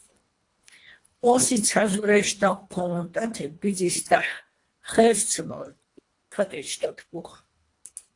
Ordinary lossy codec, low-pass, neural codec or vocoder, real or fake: AAC, 48 kbps; 10.8 kHz; codec, 24 kHz, 1.5 kbps, HILCodec; fake